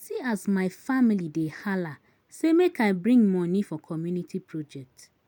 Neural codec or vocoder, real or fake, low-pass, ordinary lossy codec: none; real; none; none